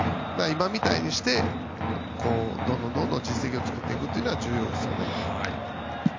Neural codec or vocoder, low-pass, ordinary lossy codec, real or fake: none; 7.2 kHz; MP3, 48 kbps; real